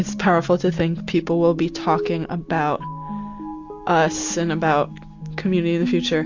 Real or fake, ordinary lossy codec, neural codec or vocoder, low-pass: real; AAC, 48 kbps; none; 7.2 kHz